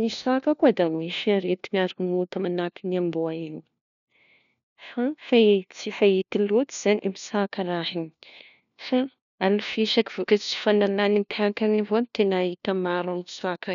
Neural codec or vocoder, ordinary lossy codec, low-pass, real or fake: codec, 16 kHz, 1 kbps, FunCodec, trained on LibriTTS, 50 frames a second; none; 7.2 kHz; fake